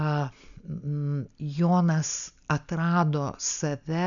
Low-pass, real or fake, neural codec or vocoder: 7.2 kHz; real; none